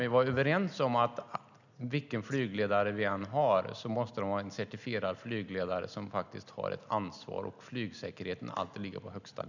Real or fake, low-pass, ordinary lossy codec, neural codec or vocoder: real; 7.2 kHz; none; none